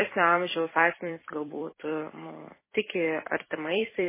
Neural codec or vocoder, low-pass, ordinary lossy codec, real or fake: vocoder, 44.1 kHz, 128 mel bands every 256 samples, BigVGAN v2; 3.6 kHz; MP3, 16 kbps; fake